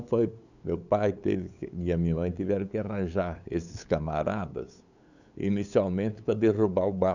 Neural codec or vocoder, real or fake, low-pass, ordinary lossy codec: codec, 16 kHz, 8 kbps, FunCodec, trained on LibriTTS, 25 frames a second; fake; 7.2 kHz; none